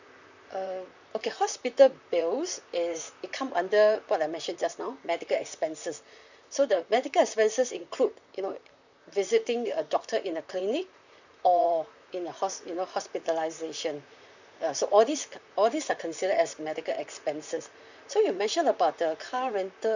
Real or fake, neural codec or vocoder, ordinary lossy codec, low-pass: fake; vocoder, 44.1 kHz, 128 mel bands, Pupu-Vocoder; none; 7.2 kHz